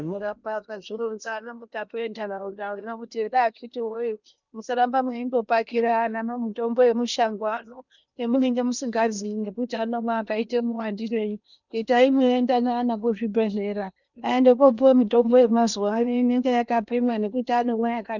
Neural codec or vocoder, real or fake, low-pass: codec, 16 kHz in and 24 kHz out, 0.8 kbps, FocalCodec, streaming, 65536 codes; fake; 7.2 kHz